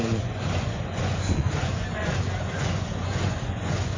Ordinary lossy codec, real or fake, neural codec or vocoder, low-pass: AAC, 32 kbps; fake; vocoder, 24 kHz, 100 mel bands, Vocos; 7.2 kHz